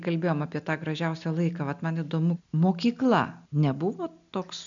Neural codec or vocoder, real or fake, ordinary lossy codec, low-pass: none; real; MP3, 96 kbps; 7.2 kHz